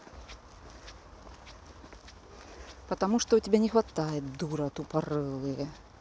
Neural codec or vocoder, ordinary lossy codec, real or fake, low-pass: none; none; real; none